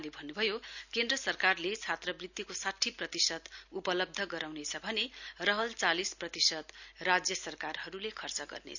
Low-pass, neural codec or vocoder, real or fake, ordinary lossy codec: 7.2 kHz; none; real; none